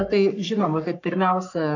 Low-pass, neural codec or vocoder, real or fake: 7.2 kHz; codec, 44.1 kHz, 3.4 kbps, Pupu-Codec; fake